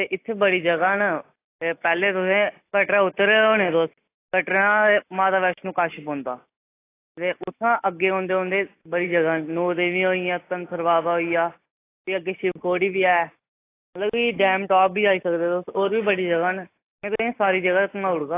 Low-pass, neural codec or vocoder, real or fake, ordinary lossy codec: 3.6 kHz; none; real; AAC, 24 kbps